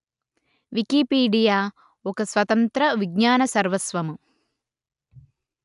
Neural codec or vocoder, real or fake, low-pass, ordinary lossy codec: none; real; 10.8 kHz; AAC, 96 kbps